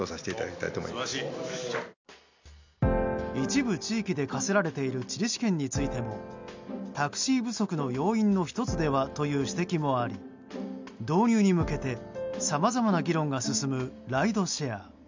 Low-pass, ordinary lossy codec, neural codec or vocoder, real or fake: 7.2 kHz; MP3, 64 kbps; none; real